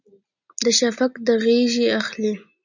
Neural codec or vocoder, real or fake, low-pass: none; real; 7.2 kHz